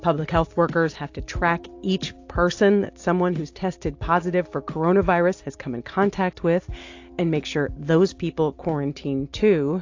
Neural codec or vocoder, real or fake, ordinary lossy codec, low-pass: none; real; AAC, 48 kbps; 7.2 kHz